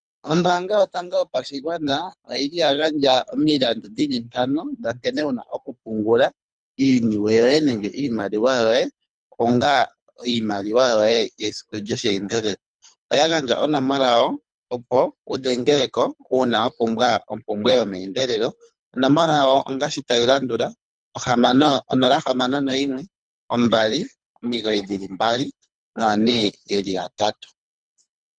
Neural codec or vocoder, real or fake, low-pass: codec, 24 kHz, 3 kbps, HILCodec; fake; 9.9 kHz